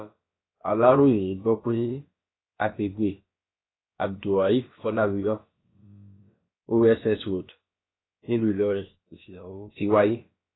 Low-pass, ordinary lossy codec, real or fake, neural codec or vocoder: 7.2 kHz; AAC, 16 kbps; fake; codec, 16 kHz, about 1 kbps, DyCAST, with the encoder's durations